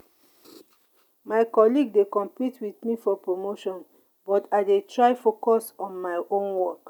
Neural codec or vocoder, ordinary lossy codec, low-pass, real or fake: none; none; 19.8 kHz; real